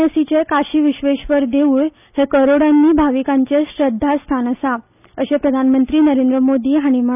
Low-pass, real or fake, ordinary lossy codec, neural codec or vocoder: 3.6 kHz; real; none; none